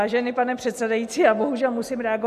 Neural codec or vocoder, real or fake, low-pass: none; real; 14.4 kHz